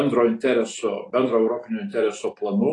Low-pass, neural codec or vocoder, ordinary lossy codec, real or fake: 10.8 kHz; none; AAC, 32 kbps; real